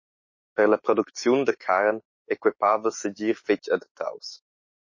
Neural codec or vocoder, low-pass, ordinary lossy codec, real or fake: autoencoder, 48 kHz, 128 numbers a frame, DAC-VAE, trained on Japanese speech; 7.2 kHz; MP3, 32 kbps; fake